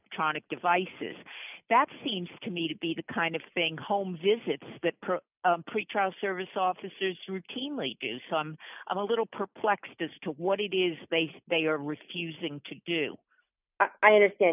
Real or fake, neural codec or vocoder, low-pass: real; none; 3.6 kHz